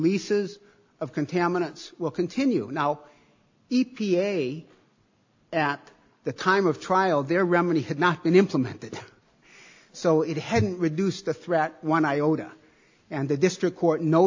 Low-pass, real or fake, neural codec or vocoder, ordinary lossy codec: 7.2 kHz; real; none; AAC, 48 kbps